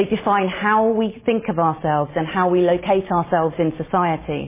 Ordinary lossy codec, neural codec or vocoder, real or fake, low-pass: MP3, 16 kbps; none; real; 3.6 kHz